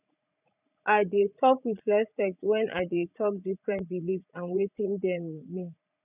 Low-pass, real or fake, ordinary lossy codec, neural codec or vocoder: 3.6 kHz; fake; none; vocoder, 44.1 kHz, 80 mel bands, Vocos